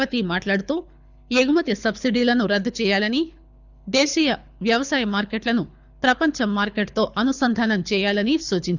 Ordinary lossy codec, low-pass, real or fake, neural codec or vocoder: none; 7.2 kHz; fake; codec, 24 kHz, 6 kbps, HILCodec